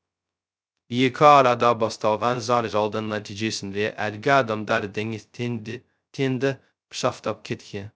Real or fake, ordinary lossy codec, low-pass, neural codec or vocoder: fake; none; none; codec, 16 kHz, 0.2 kbps, FocalCodec